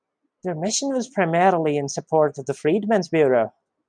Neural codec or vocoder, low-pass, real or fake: none; 9.9 kHz; real